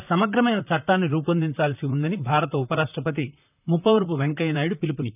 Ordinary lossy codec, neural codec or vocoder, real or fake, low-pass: none; vocoder, 44.1 kHz, 128 mel bands, Pupu-Vocoder; fake; 3.6 kHz